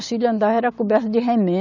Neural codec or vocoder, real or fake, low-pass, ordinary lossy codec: none; real; 7.2 kHz; none